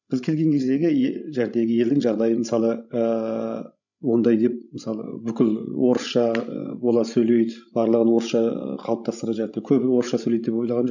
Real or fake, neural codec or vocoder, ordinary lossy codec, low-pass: fake; codec, 16 kHz, 16 kbps, FreqCodec, larger model; none; 7.2 kHz